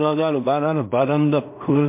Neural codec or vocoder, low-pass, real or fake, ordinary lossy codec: codec, 16 kHz in and 24 kHz out, 0.4 kbps, LongCat-Audio-Codec, two codebook decoder; 3.6 kHz; fake; MP3, 32 kbps